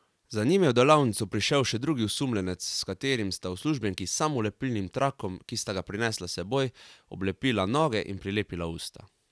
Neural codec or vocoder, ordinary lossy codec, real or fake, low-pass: none; none; real; none